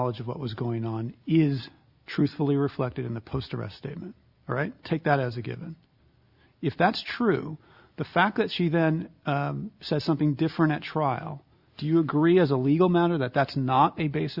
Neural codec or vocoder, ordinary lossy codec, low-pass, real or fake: none; Opus, 64 kbps; 5.4 kHz; real